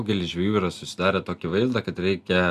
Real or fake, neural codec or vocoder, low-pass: real; none; 14.4 kHz